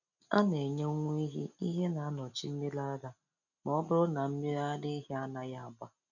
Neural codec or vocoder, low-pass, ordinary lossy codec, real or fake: none; 7.2 kHz; none; real